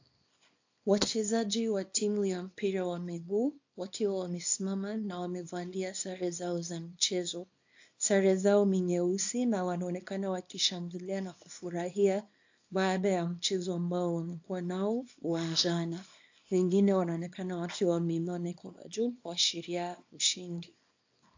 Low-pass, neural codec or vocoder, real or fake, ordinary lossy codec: 7.2 kHz; codec, 24 kHz, 0.9 kbps, WavTokenizer, small release; fake; AAC, 48 kbps